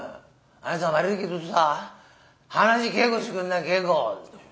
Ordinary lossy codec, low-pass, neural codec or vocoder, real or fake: none; none; none; real